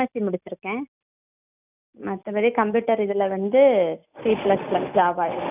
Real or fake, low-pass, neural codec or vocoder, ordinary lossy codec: real; 3.6 kHz; none; none